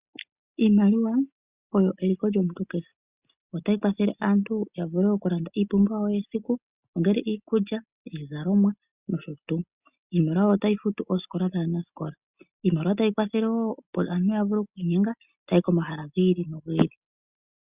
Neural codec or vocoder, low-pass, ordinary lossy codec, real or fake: none; 3.6 kHz; Opus, 64 kbps; real